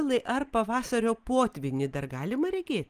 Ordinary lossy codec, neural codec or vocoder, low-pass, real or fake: Opus, 32 kbps; none; 14.4 kHz; real